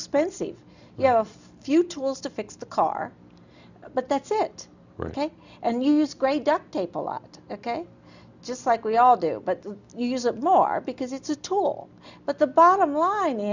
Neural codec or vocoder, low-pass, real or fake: none; 7.2 kHz; real